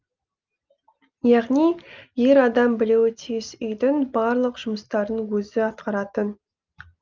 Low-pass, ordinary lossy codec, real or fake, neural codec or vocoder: 7.2 kHz; Opus, 24 kbps; real; none